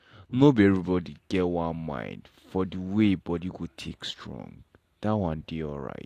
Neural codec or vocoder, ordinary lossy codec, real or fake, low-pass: none; AAC, 64 kbps; real; 14.4 kHz